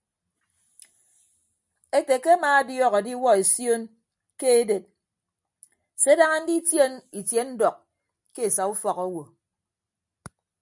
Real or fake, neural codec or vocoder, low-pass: real; none; 10.8 kHz